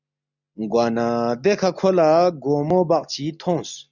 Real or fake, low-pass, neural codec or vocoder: real; 7.2 kHz; none